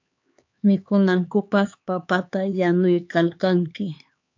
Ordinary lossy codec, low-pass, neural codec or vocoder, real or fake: AAC, 48 kbps; 7.2 kHz; codec, 16 kHz, 4 kbps, X-Codec, HuBERT features, trained on LibriSpeech; fake